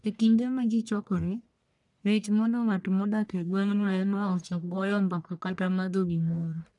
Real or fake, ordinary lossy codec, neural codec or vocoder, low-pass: fake; none; codec, 44.1 kHz, 1.7 kbps, Pupu-Codec; 10.8 kHz